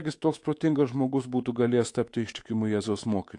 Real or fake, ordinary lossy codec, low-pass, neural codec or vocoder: fake; AAC, 48 kbps; 10.8 kHz; codec, 24 kHz, 3.1 kbps, DualCodec